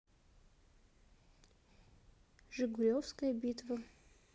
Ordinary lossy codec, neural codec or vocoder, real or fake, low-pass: none; none; real; none